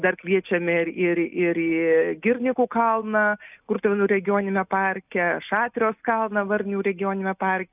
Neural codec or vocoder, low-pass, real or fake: none; 3.6 kHz; real